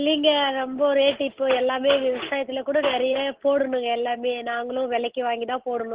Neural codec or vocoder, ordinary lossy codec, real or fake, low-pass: none; Opus, 16 kbps; real; 3.6 kHz